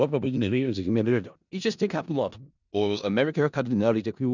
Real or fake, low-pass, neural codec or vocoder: fake; 7.2 kHz; codec, 16 kHz in and 24 kHz out, 0.4 kbps, LongCat-Audio-Codec, four codebook decoder